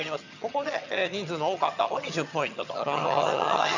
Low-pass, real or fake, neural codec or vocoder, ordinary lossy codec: 7.2 kHz; fake; vocoder, 22.05 kHz, 80 mel bands, HiFi-GAN; none